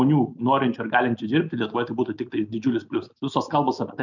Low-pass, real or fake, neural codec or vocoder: 7.2 kHz; real; none